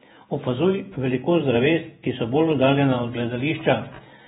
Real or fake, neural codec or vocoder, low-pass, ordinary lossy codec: fake; vocoder, 48 kHz, 128 mel bands, Vocos; 19.8 kHz; AAC, 16 kbps